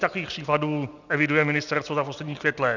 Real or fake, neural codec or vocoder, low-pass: real; none; 7.2 kHz